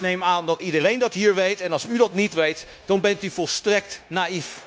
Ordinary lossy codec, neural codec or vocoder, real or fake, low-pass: none; codec, 16 kHz, 0.9 kbps, LongCat-Audio-Codec; fake; none